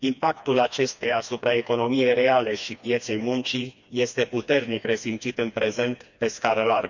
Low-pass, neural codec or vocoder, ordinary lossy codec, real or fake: 7.2 kHz; codec, 16 kHz, 2 kbps, FreqCodec, smaller model; none; fake